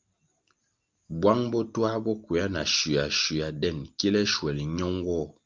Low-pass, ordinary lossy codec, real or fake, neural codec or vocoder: 7.2 kHz; Opus, 32 kbps; real; none